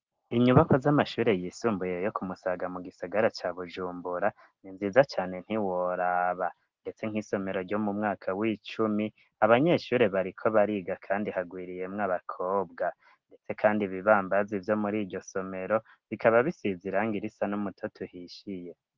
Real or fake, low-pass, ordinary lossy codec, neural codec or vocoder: real; 7.2 kHz; Opus, 16 kbps; none